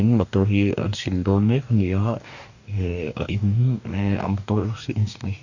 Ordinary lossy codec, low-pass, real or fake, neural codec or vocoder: none; 7.2 kHz; fake; codec, 44.1 kHz, 2.6 kbps, DAC